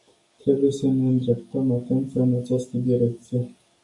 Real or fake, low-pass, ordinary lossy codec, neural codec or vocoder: fake; 10.8 kHz; AAC, 64 kbps; codec, 44.1 kHz, 7.8 kbps, DAC